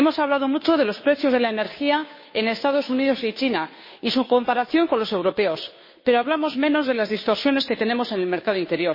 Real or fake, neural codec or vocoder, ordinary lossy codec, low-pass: fake; codec, 16 kHz, 2 kbps, FunCodec, trained on Chinese and English, 25 frames a second; MP3, 24 kbps; 5.4 kHz